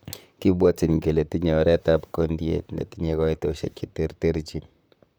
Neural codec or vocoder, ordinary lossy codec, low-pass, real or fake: vocoder, 44.1 kHz, 128 mel bands, Pupu-Vocoder; none; none; fake